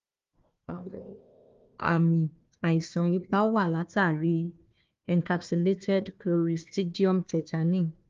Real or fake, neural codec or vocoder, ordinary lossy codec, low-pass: fake; codec, 16 kHz, 1 kbps, FunCodec, trained on Chinese and English, 50 frames a second; Opus, 32 kbps; 7.2 kHz